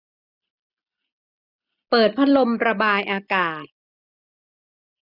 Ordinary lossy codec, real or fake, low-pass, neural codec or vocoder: none; real; 5.4 kHz; none